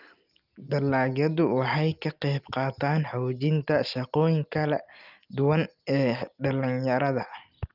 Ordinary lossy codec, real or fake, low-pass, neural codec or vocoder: Opus, 32 kbps; real; 5.4 kHz; none